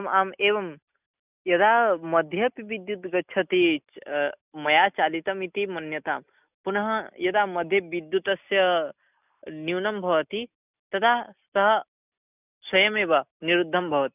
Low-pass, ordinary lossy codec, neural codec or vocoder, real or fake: 3.6 kHz; none; none; real